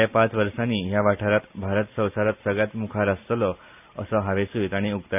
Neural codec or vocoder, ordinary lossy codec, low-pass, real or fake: none; none; 3.6 kHz; real